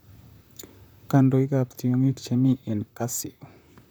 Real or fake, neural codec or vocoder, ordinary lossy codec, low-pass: fake; vocoder, 44.1 kHz, 128 mel bands, Pupu-Vocoder; none; none